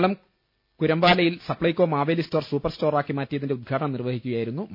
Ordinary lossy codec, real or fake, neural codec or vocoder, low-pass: MP3, 32 kbps; real; none; 5.4 kHz